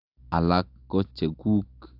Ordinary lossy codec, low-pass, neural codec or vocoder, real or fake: AAC, 48 kbps; 5.4 kHz; none; real